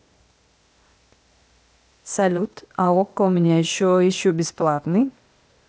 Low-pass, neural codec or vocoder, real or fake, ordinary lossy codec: none; codec, 16 kHz, 0.8 kbps, ZipCodec; fake; none